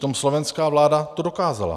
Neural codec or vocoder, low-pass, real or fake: none; 14.4 kHz; real